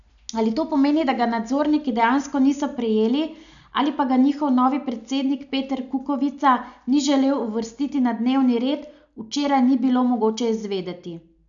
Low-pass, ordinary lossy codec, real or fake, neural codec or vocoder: 7.2 kHz; none; real; none